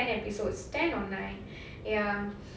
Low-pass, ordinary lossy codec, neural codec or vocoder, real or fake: none; none; none; real